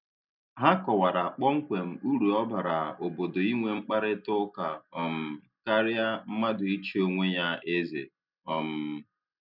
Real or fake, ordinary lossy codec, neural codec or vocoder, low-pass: real; none; none; 5.4 kHz